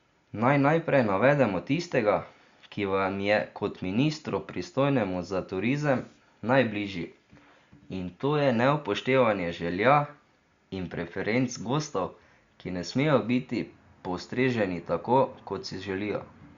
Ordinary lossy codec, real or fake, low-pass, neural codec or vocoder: Opus, 64 kbps; real; 7.2 kHz; none